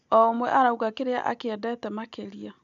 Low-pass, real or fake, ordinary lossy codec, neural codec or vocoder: 7.2 kHz; real; none; none